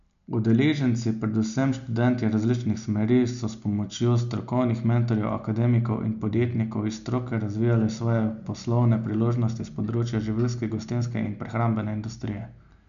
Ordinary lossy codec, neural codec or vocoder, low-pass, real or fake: none; none; 7.2 kHz; real